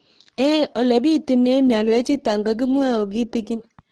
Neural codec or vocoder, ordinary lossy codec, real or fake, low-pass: codec, 24 kHz, 0.9 kbps, WavTokenizer, medium speech release version 2; Opus, 16 kbps; fake; 10.8 kHz